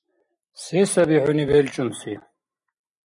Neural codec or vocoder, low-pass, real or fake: none; 10.8 kHz; real